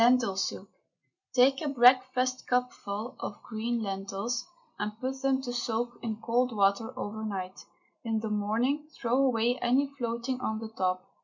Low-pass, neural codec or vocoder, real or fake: 7.2 kHz; none; real